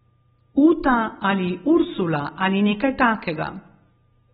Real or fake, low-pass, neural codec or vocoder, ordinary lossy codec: real; 19.8 kHz; none; AAC, 16 kbps